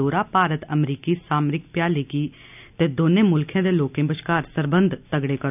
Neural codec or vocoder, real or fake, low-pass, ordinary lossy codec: none; real; 3.6 kHz; none